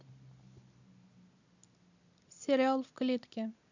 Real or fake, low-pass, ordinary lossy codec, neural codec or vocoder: real; 7.2 kHz; none; none